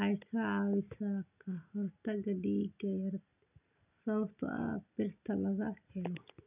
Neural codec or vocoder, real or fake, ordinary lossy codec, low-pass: none; real; none; 3.6 kHz